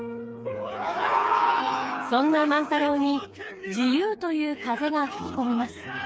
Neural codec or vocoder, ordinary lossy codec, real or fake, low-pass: codec, 16 kHz, 4 kbps, FreqCodec, smaller model; none; fake; none